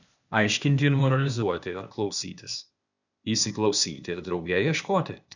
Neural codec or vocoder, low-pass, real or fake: codec, 16 kHz, 0.8 kbps, ZipCodec; 7.2 kHz; fake